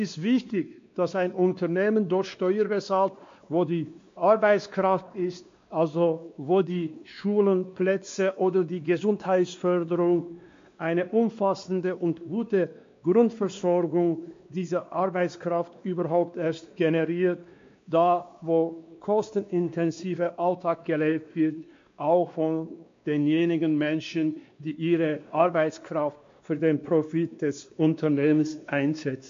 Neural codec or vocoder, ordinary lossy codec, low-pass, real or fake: codec, 16 kHz, 2 kbps, X-Codec, WavLM features, trained on Multilingual LibriSpeech; MP3, 48 kbps; 7.2 kHz; fake